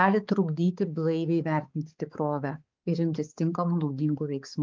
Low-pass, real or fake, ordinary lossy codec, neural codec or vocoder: 7.2 kHz; fake; Opus, 32 kbps; codec, 16 kHz, 4 kbps, X-Codec, HuBERT features, trained on balanced general audio